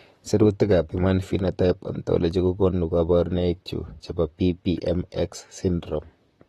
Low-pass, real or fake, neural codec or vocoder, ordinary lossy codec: 19.8 kHz; fake; vocoder, 44.1 kHz, 128 mel bands, Pupu-Vocoder; AAC, 32 kbps